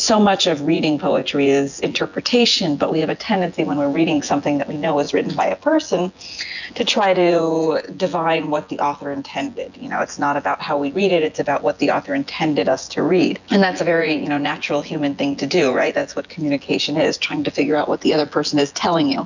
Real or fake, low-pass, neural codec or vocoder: fake; 7.2 kHz; vocoder, 24 kHz, 100 mel bands, Vocos